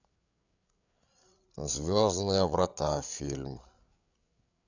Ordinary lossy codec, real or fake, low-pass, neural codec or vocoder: none; fake; 7.2 kHz; codec, 16 kHz, 8 kbps, FreqCodec, larger model